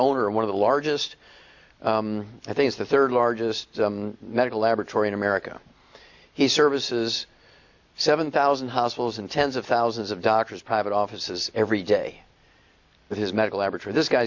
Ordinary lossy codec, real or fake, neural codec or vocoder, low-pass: Opus, 64 kbps; real; none; 7.2 kHz